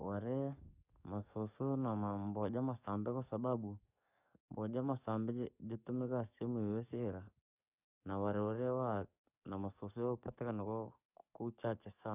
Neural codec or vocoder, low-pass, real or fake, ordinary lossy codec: codec, 44.1 kHz, 7.8 kbps, DAC; 3.6 kHz; fake; none